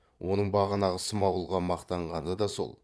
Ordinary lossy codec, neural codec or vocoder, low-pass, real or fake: none; vocoder, 22.05 kHz, 80 mel bands, Vocos; none; fake